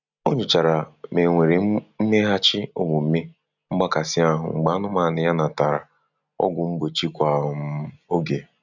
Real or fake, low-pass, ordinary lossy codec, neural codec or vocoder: real; 7.2 kHz; none; none